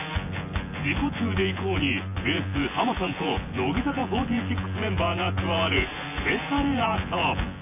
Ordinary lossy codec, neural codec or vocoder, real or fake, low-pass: AAC, 24 kbps; vocoder, 24 kHz, 100 mel bands, Vocos; fake; 3.6 kHz